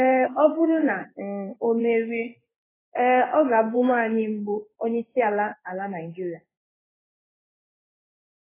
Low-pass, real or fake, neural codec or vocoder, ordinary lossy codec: 3.6 kHz; fake; codec, 16 kHz in and 24 kHz out, 1 kbps, XY-Tokenizer; AAC, 16 kbps